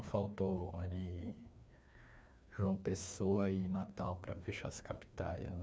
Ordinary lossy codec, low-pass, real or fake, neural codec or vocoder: none; none; fake; codec, 16 kHz, 2 kbps, FreqCodec, larger model